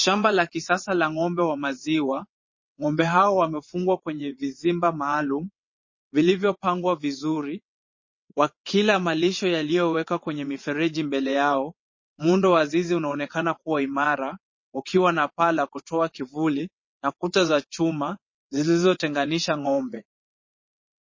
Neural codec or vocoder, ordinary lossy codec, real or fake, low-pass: vocoder, 22.05 kHz, 80 mel bands, WaveNeXt; MP3, 32 kbps; fake; 7.2 kHz